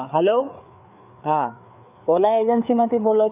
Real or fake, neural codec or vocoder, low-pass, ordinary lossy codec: fake; codec, 16 kHz, 4 kbps, FreqCodec, larger model; 3.6 kHz; none